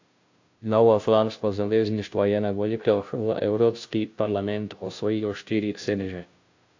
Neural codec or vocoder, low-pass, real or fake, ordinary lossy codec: codec, 16 kHz, 0.5 kbps, FunCodec, trained on Chinese and English, 25 frames a second; 7.2 kHz; fake; AAC, 48 kbps